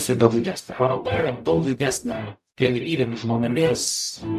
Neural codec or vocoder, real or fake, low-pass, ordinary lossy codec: codec, 44.1 kHz, 0.9 kbps, DAC; fake; 14.4 kHz; MP3, 96 kbps